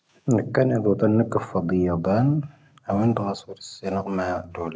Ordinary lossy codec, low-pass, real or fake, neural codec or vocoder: none; none; real; none